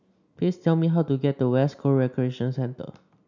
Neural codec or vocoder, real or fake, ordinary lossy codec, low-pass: none; real; none; 7.2 kHz